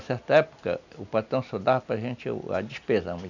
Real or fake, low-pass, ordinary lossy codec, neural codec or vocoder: real; 7.2 kHz; none; none